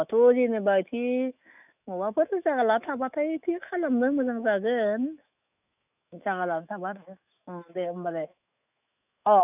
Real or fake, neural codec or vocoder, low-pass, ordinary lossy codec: fake; autoencoder, 48 kHz, 128 numbers a frame, DAC-VAE, trained on Japanese speech; 3.6 kHz; none